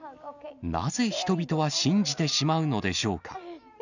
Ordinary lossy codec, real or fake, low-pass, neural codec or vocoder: none; real; 7.2 kHz; none